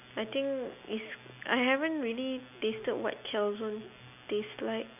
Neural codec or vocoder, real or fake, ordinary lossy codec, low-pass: none; real; none; 3.6 kHz